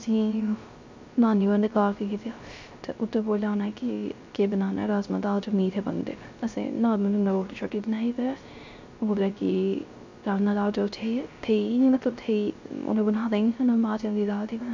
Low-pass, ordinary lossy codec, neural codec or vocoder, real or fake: 7.2 kHz; MP3, 64 kbps; codec, 16 kHz, 0.3 kbps, FocalCodec; fake